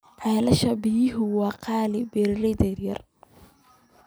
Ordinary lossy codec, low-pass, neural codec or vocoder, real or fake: none; none; none; real